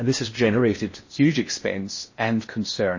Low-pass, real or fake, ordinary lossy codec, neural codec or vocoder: 7.2 kHz; fake; MP3, 32 kbps; codec, 16 kHz in and 24 kHz out, 0.6 kbps, FocalCodec, streaming, 4096 codes